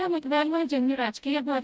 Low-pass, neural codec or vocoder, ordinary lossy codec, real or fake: none; codec, 16 kHz, 0.5 kbps, FreqCodec, smaller model; none; fake